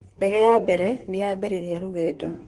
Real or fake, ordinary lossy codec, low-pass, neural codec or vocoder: fake; Opus, 24 kbps; 10.8 kHz; codec, 24 kHz, 1 kbps, SNAC